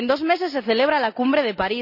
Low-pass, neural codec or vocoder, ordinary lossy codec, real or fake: 5.4 kHz; none; none; real